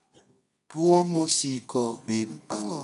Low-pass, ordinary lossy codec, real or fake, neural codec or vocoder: 10.8 kHz; none; fake; codec, 24 kHz, 0.9 kbps, WavTokenizer, medium music audio release